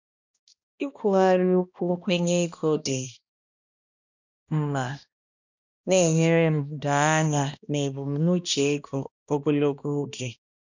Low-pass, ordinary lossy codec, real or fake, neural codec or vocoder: 7.2 kHz; none; fake; codec, 16 kHz, 1 kbps, X-Codec, HuBERT features, trained on balanced general audio